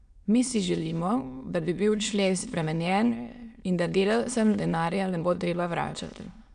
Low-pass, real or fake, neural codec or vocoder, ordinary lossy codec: 9.9 kHz; fake; autoencoder, 22.05 kHz, a latent of 192 numbers a frame, VITS, trained on many speakers; none